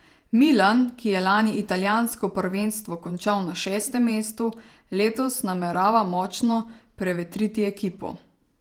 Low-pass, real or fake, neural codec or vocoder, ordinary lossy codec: 19.8 kHz; fake; vocoder, 48 kHz, 128 mel bands, Vocos; Opus, 24 kbps